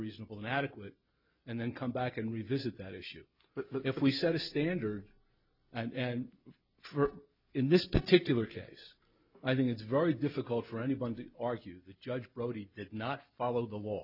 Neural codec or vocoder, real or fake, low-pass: none; real; 5.4 kHz